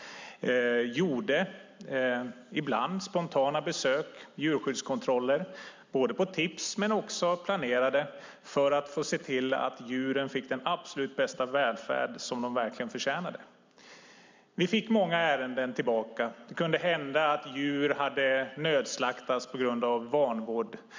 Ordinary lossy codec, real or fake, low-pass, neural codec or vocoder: MP3, 64 kbps; real; 7.2 kHz; none